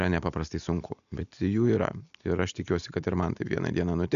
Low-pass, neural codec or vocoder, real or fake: 7.2 kHz; none; real